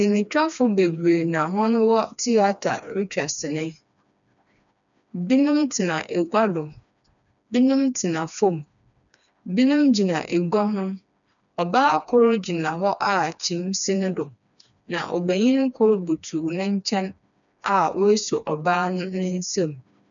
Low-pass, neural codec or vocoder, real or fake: 7.2 kHz; codec, 16 kHz, 2 kbps, FreqCodec, smaller model; fake